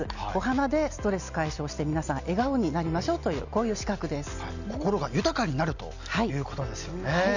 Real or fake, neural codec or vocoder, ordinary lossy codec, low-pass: real; none; none; 7.2 kHz